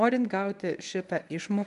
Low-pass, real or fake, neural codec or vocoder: 10.8 kHz; fake; codec, 24 kHz, 0.9 kbps, WavTokenizer, medium speech release version 1